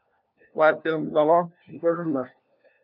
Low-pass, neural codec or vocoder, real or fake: 5.4 kHz; codec, 16 kHz, 1 kbps, FunCodec, trained on LibriTTS, 50 frames a second; fake